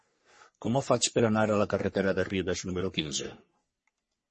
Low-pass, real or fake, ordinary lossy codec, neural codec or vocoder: 10.8 kHz; fake; MP3, 32 kbps; codec, 44.1 kHz, 3.4 kbps, Pupu-Codec